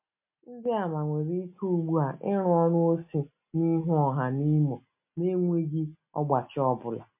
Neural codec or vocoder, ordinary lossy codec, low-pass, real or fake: none; MP3, 32 kbps; 3.6 kHz; real